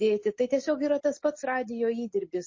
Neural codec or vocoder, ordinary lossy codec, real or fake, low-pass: none; MP3, 32 kbps; real; 7.2 kHz